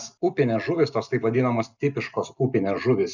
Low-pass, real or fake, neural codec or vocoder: 7.2 kHz; real; none